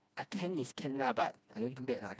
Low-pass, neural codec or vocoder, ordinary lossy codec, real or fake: none; codec, 16 kHz, 1 kbps, FreqCodec, smaller model; none; fake